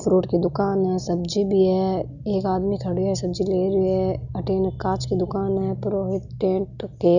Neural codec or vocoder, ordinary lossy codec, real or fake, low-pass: none; none; real; 7.2 kHz